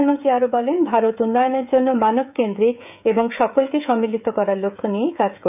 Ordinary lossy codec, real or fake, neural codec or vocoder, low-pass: none; fake; codec, 16 kHz, 16 kbps, FreqCodec, smaller model; 3.6 kHz